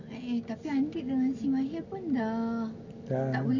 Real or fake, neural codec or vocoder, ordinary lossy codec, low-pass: real; none; none; 7.2 kHz